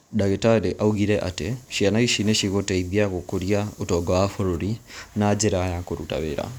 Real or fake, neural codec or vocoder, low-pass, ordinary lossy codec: real; none; none; none